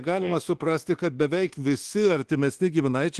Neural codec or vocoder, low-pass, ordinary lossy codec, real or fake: codec, 24 kHz, 1.2 kbps, DualCodec; 10.8 kHz; Opus, 16 kbps; fake